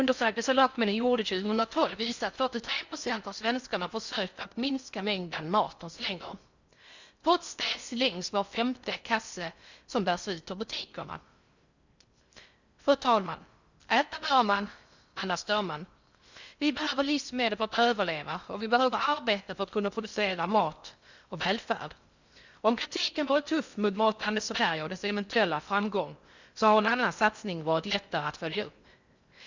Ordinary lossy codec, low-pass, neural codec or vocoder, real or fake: Opus, 64 kbps; 7.2 kHz; codec, 16 kHz in and 24 kHz out, 0.6 kbps, FocalCodec, streaming, 4096 codes; fake